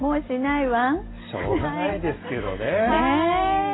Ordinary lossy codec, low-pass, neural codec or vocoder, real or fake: AAC, 16 kbps; 7.2 kHz; none; real